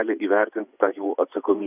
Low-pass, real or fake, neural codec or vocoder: 3.6 kHz; fake; vocoder, 24 kHz, 100 mel bands, Vocos